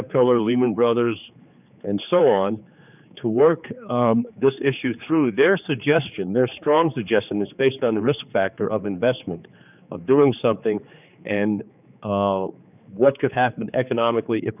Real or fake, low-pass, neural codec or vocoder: fake; 3.6 kHz; codec, 16 kHz, 4 kbps, X-Codec, HuBERT features, trained on general audio